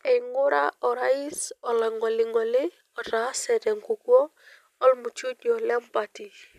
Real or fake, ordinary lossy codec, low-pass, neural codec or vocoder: real; none; 14.4 kHz; none